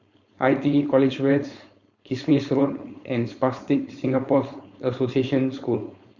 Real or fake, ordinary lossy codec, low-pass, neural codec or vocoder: fake; Opus, 64 kbps; 7.2 kHz; codec, 16 kHz, 4.8 kbps, FACodec